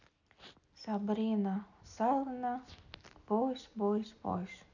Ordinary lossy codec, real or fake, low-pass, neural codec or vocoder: none; real; 7.2 kHz; none